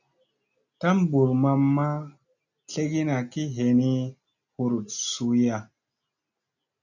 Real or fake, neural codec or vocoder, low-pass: real; none; 7.2 kHz